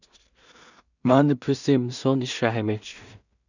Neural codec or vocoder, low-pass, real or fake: codec, 16 kHz in and 24 kHz out, 0.4 kbps, LongCat-Audio-Codec, two codebook decoder; 7.2 kHz; fake